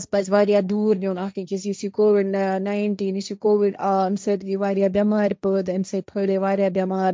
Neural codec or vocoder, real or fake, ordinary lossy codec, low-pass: codec, 16 kHz, 1.1 kbps, Voila-Tokenizer; fake; none; none